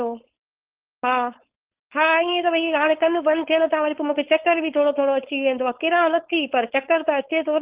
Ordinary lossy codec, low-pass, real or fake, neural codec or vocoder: Opus, 16 kbps; 3.6 kHz; fake; codec, 16 kHz, 4.8 kbps, FACodec